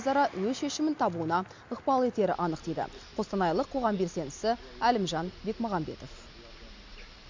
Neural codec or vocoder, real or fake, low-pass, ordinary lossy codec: none; real; 7.2 kHz; MP3, 64 kbps